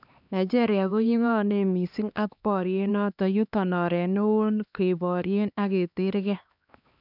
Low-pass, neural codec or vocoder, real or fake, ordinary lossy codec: 5.4 kHz; codec, 16 kHz, 2 kbps, X-Codec, HuBERT features, trained on LibriSpeech; fake; none